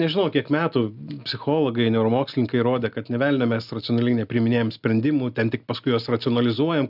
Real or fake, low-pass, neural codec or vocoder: real; 5.4 kHz; none